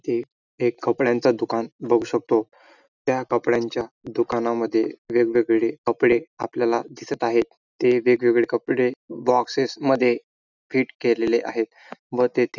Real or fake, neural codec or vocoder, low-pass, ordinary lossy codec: real; none; 7.2 kHz; none